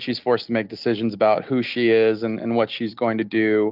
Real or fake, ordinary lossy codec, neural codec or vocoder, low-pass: real; Opus, 24 kbps; none; 5.4 kHz